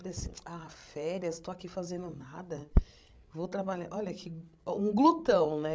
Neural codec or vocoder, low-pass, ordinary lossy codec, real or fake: codec, 16 kHz, 16 kbps, FreqCodec, larger model; none; none; fake